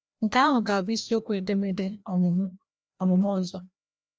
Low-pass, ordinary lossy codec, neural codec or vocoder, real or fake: none; none; codec, 16 kHz, 1 kbps, FreqCodec, larger model; fake